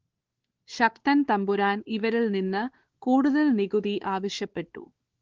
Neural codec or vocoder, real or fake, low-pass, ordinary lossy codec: codec, 16 kHz, 4 kbps, X-Codec, WavLM features, trained on Multilingual LibriSpeech; fake; 7.2 kHz; Opus, 16 kbps